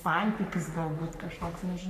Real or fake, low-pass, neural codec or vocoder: fake; 14.4 kHz; codec, 44.1 kHz, 3.4 kbps, Pupu-Codec